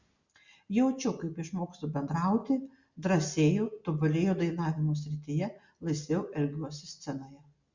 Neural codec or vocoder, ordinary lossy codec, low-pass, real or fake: none; Opus, 64 kbps; 7.2 kHz; real